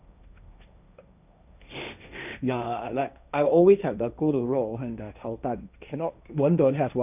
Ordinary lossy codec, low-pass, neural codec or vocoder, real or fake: none; 3.6 kHz; codec, 16 kHz, 1.1 kbps, Voila-Tokenizer; fake